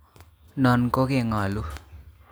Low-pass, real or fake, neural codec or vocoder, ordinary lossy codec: none; real; none; none